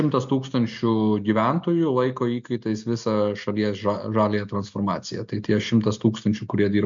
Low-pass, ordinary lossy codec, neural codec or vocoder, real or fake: 7.2 kHz; MP3, 64 kbps; none; real